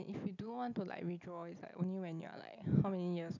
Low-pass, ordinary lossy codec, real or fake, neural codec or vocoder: 7.2 kHz; none; real; none